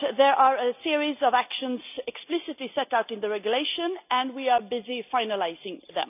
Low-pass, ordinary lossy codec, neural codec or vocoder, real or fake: 3.6 kHz; none; none; real